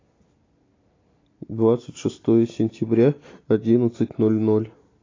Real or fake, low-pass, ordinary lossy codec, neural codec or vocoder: real; 7.2 kHz; AAC, 32 kbps; none